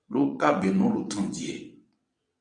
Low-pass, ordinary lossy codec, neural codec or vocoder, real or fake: 9.9 kHz; MP3, 64 kbps; vocoder, 22.05 kHz, 80 mel bands, WaveNeXt; fake